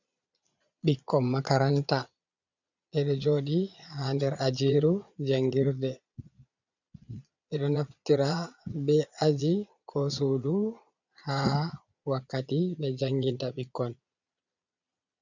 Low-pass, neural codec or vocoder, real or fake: 7.2 kHz; vocoder, 22.05 kHz, 80 mel bands, Vocos; fake